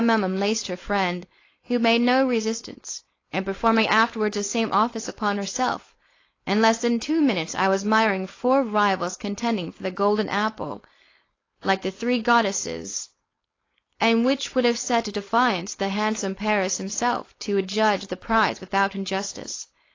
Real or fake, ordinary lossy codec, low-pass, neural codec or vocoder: fake; AAC, 32 kbps; 7.2 kHz; codec, 16 kHz, 4.8 kbps, FACodec